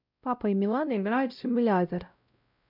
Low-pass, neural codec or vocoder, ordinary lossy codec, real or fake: 5.4 kHz; codec, 16 kHz, 0.5 kbps, X-Codec, WavLM features, trained on Multilingual LibriSpeech; none; fake